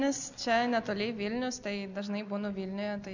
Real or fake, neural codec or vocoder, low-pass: real; none; 7.2 kHz